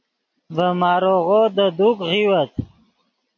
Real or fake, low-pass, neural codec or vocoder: real; 7.2 kHz; none